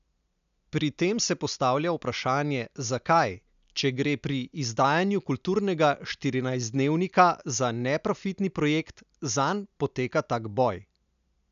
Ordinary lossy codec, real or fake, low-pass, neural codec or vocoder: none; real; 7.2 kHz; none